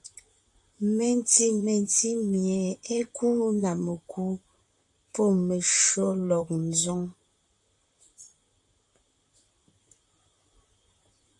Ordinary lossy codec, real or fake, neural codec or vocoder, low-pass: AAC, 64 kbps; fake; vocoder, 44.1 kHz, 128 mel bands, Pupu-Vocoder; 10.8 kHz